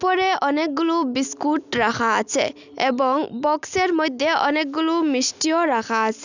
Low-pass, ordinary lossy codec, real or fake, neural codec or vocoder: 7.2 kHz; none; real; none